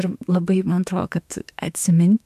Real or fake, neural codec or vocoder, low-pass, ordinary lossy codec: fake; autoencoder, 48 kHz, 32 numbers a frame, DAC-VAE, trained on Japanese speech; 14.4 kHz; MP3, 96 kbps